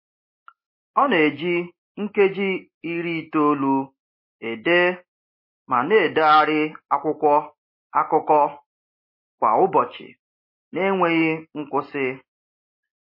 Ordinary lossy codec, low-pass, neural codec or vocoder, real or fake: MP3, 24 kbps; 5.4 kHz; none; real